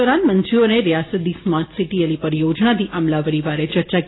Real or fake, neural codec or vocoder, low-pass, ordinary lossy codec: real; none; 7.2 kHz; AAC, 16 kbps